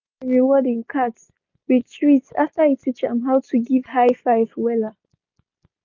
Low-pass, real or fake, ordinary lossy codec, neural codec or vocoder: 7.2 kHz; real; none; none